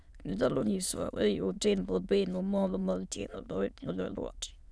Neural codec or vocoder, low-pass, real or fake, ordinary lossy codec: autoencoder, 22.05 kHz, a latent of 192 numbers a frame, VITS, trained on many speakers; none; fake; none